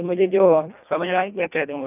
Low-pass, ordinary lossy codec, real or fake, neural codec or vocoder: 3.6 kHz; none; fake; codec, 24 kHz, 1.5 kbps, HILCodec